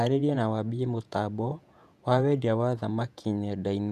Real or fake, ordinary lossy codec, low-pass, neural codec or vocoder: fake; none; 14.4 kHz; vocoder, 48 kHz, 128 mel bands, Vocos